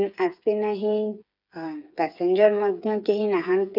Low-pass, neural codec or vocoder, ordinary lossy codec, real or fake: 5.4 kHz; codec, 16 kHz, 8 kbps, FreqCodec, smaller model; none; fake